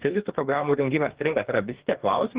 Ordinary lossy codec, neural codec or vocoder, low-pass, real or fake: Opus, 16 kbps; codec, 44.1 kHz, 2.6 kbps, DAC; 3.6 kHz; fake